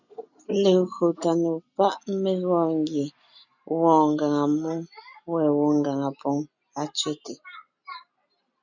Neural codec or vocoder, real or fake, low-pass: none; real; 7.2 kHz